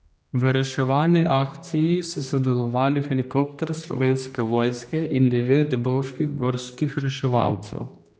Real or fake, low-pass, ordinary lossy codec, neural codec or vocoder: fake; none; none; codec, 16 kHz, 1 kbps, X-Codec, HuBERT features, trained on general audio